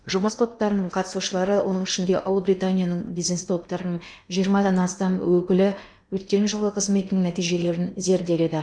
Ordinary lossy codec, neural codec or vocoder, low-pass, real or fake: none; codec, 16 kHz in and 24 kHz out, 0.8 kbps, FocalCodec, streaming, 65536 codes; 9.9 kHz; fake